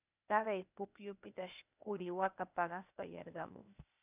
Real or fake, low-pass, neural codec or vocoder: fake; 3.6 kHz; codec, 16 kHz, 0.8 kbps, ZipCodec